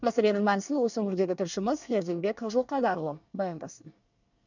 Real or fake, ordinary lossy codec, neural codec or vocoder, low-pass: fake; none; codec, 24 kHz, 1 kbps, SNAC; 7.2 kHz